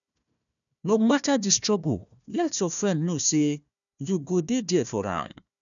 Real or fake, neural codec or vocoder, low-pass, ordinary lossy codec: fake; codec, 16 kHz, 1 kbps, FunCodec, trained on Chinese and English, 50 frames a second; 7.2 kHz; none